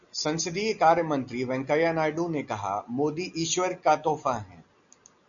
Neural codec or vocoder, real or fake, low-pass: none; real; 7.2 kHz